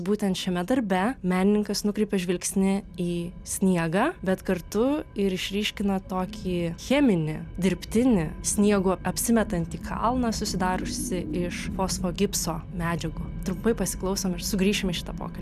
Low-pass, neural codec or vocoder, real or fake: 14.4 kHz; none; real